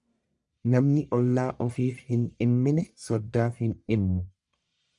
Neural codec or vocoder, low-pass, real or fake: codec, 44.1 kHz, 1.7 kbps, Pupu-Codec; 10.8 kHz; fake